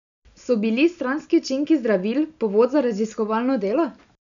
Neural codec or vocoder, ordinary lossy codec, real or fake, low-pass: none; MP3, 96 kbps; real; 7.2 kHz